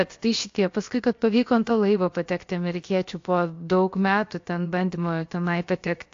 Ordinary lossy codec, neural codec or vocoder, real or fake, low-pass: AAC, 48 kbps; codec, 16 kHz, about 1 kbps, DyCAST, with the encoder's durations; fake; 7.2 kHz